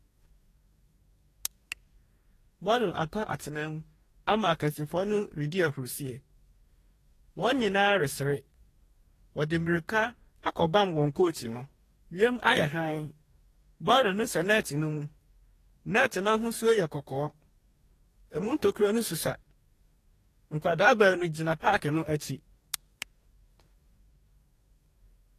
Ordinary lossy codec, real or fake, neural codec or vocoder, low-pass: AAC, 48 kbps; fake; codec, 44.1 kHz, 2.6 kbps, DAC; 14.4 kHz